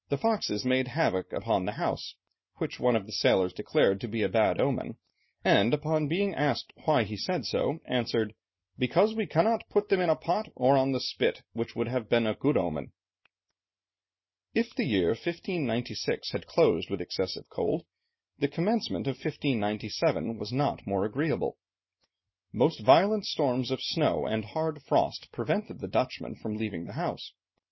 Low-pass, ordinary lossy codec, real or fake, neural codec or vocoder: 7.2 kHz; MP3, 24 kbps; real; none